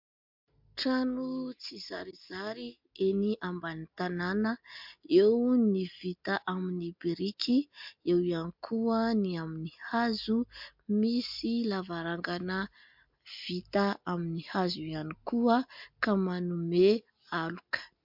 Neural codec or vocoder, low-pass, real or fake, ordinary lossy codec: none; 5.4 kHz; real; MP3, 48 kbps